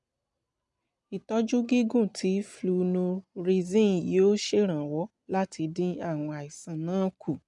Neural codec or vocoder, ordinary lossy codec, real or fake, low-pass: none; none; real; 10.8 kHz